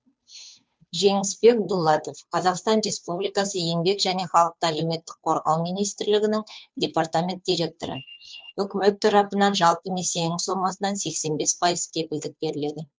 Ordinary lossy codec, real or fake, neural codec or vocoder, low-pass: none; fake; codec, 16 kHz, 2 kbps, FunCodec, trained on Chinese and English, 25 frames a second; none